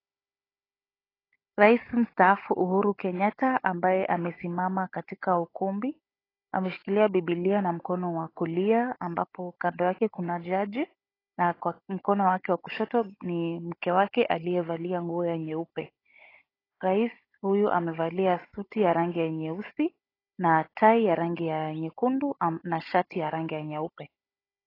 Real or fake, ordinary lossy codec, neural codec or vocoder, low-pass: fake; AAC, 24 kbps; codec, 16 kHz, 16 kbps, FunCodec, trained on Chinese and English, 50 frames a second; 5.4 kHz